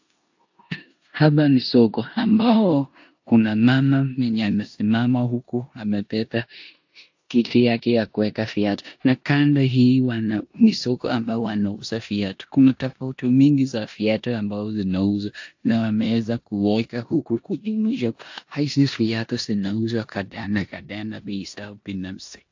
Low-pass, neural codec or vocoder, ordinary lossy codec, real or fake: 7.2 kHz; codec, 16 kHz in and 24 kHz out, 0.9 kbps, LongCat-Audio-Codec, four codebook decoder; AAC, 48 kbps; fake